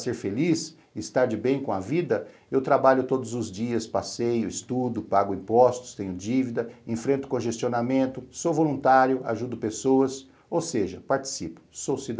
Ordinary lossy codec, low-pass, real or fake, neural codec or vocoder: none; none; real; none